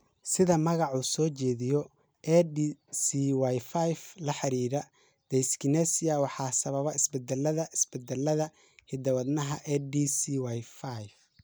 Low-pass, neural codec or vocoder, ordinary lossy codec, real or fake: none; none; none; real